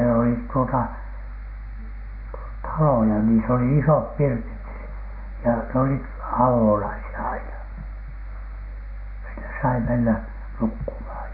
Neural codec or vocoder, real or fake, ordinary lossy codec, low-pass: none; real; AAC, 48 kbps; 5.4 kHz